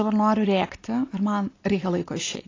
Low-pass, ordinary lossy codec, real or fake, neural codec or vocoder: 7.2 kHz; AAC, 32 kbps; real; none